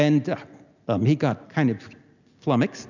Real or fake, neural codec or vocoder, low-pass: real; none; 7.2 kHz